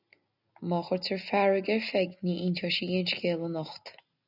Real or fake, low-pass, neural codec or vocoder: real; 5.4 kHz; none